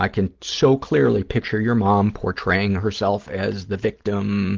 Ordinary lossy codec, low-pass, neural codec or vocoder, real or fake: Opus, 16 kbps; 7.2 kHz; none; real